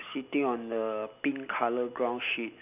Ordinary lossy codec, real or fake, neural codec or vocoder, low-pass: none; real; none; 3.6 kHz